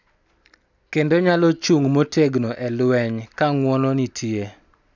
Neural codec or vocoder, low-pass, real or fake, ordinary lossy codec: none; 7.2 kHz; real; none